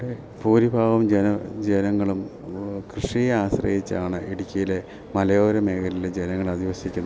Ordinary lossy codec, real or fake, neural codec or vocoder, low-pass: none; real; none; none